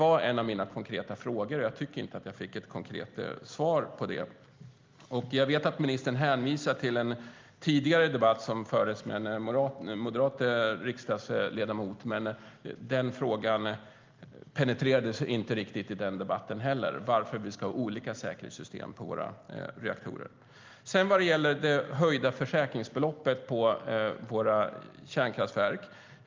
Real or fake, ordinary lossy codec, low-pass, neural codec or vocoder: real; Opus, 32 kbps; 7.2 kHz; none